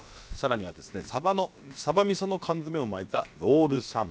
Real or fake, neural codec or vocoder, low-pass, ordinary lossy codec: fake; codec, 16 kHz, about 1 kbps, DyCAST, with the encoder's durations; none; none